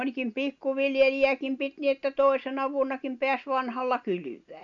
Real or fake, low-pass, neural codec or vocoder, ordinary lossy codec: real; 7.2 kHz; none; none